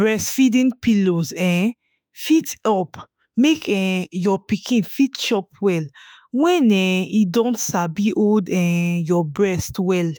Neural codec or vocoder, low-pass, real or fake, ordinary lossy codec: autoencoder, 48 kHz, 32 numbers a frame, DAC-VAE, trained on Japanese speech; none; fake; none